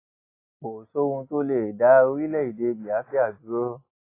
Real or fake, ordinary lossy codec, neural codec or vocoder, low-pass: real; AAC, 24 kbps; none; 3.6 kHz